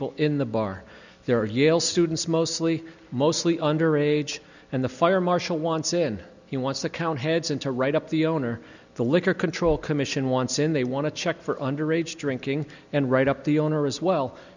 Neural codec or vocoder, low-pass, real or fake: none; 7.2 kHz; real